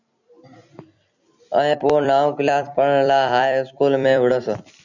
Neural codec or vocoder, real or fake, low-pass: vocoder, 44.1 kHz, 128 mel bands every 256 samples, BigVGAN v2; fake; 7.2 kHz